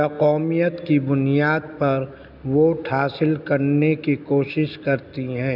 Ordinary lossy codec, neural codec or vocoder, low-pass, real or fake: none; none; 5.4 kHz; real